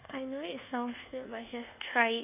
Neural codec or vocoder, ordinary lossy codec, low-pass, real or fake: codec, 24 kHz, 1.2 kbps, DualCodec; none; 3.6 kHz; fake